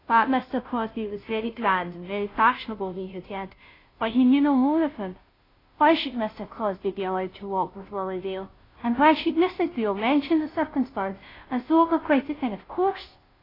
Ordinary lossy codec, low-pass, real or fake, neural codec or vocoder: AAC, 24 kbps; 5.4 kHz; fake; codec, 16 kHz, 0.5 kbps, FunCodec, trained on Chinese and English, 25 frames a second